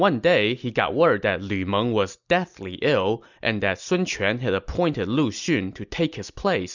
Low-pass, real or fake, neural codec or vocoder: 7.2 kHz; real; none